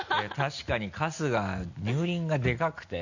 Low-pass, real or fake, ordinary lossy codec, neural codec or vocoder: 7.2 kHz; real; none; none